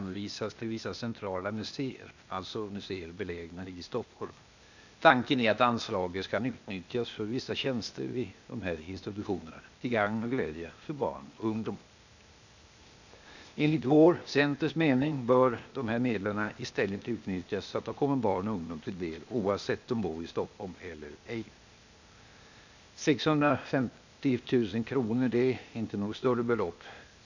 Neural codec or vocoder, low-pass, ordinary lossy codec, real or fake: codec, 16 kHz, 0.8 kbps, ZipCodec; 7.2 kHz; none; fake